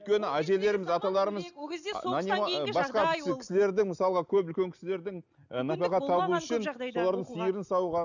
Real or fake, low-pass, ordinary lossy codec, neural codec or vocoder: real; 7.2 kHz; none; none